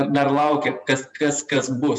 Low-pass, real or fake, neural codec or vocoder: 10.8 kHz; fake; vocoder, 48 kHz, 128 mel bands, Vocos